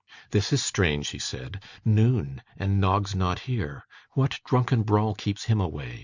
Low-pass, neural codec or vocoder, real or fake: 7.2 kHz; none; real